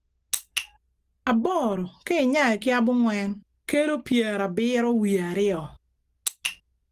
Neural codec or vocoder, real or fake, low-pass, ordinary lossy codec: none; real; 14.4 kHz; Opus, 24 kbps